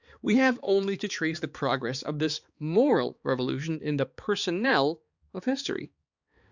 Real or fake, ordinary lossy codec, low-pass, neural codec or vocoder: fake; Opus, 64 kbps; 7.2 kHz; codec, 16 kHz, 4 kbps, X-Codec, HuBERT features, trained on balanced general audio